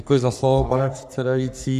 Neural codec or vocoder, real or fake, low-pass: codec, 44.1 kHz, 3.4 kbps, Pupu-Codec; fake; 14.4 kHz